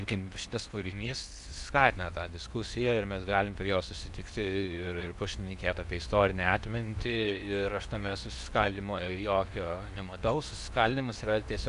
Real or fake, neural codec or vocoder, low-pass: fake; codec, 16 kHz in and 24 kHz out, 0.8 kbps, FocalCodec, streaming, 65536 codes; 10.8 kHz